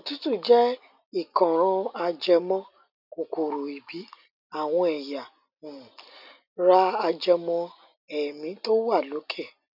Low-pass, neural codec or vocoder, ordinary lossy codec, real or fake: 5.4 kHz; none; none; real